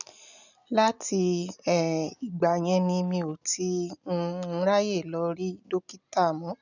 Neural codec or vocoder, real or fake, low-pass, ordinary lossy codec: none; real; 7.2 kHz; none